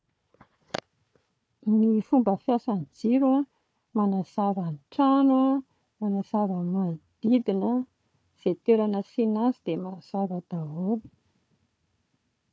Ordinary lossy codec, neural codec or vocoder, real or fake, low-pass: none; codec, 16 kHz, 4 kbps, FunCodec, trained on Chinese and English, 50 frames a second; fake; none